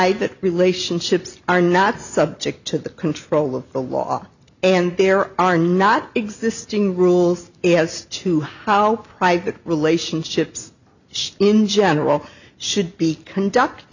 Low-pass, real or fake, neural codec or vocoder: 7.2 kHz; real; none